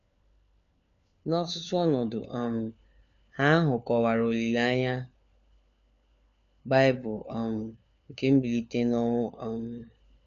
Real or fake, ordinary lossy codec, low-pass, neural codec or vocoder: fake; MP3, 96 kbps; 7.2 kHz; codec, 16 kHz, 4 kbps, FunCodec, trained on LibriTTS, 50 frames a second